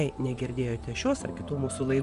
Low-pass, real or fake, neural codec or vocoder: 10.8 kHz; fake; vocoder, 24 kHz, 100 mel bands, Vocos